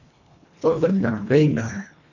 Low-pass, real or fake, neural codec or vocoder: 7.2 kHz; fake; codec, 24 kHz, 1.5 kbps, HILCodec